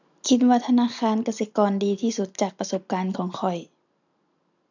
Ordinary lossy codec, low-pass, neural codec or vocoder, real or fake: none; 7.2 kHz; none; real